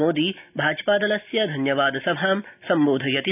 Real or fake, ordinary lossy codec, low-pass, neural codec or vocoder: real; none; 3.6 kHz; none